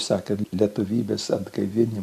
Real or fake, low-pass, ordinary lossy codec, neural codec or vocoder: real; 14.4 kHz; AAC, 96 kbps; none